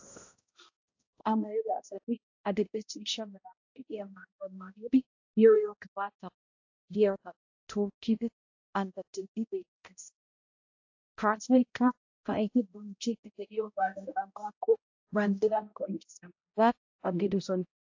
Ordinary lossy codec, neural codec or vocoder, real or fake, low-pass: AAC, 48 kbps; codec, 16 kHz, 0.5 kbps, X-Codec, HuBERT features, trained on balanced general audio; fake; 7.2 kHz